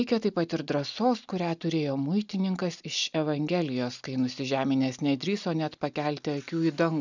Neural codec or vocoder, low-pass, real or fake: none; 7.2 kHz; real